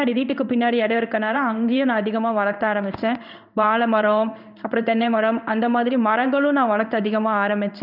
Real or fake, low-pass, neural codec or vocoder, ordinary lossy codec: fake; 5.4 kHz; codec, 16 kHz in and 24 kHz out, 1 kbps, XY-Tokenizer; none